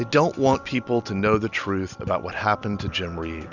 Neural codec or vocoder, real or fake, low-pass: none; real; 7.2 kHz